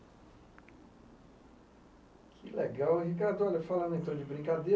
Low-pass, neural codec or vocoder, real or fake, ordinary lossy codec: none; none; real; none